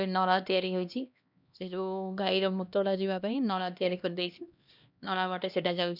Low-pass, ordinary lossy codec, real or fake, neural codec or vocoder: 5.4 kHz; none; fake; codec, 16 kHz, 1 kbps, X-Codec, HuBERT features, trained on LibriSpeech